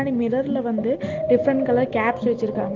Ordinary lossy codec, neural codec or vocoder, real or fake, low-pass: Opus, 16 kbps; none; real; 7.2 kHz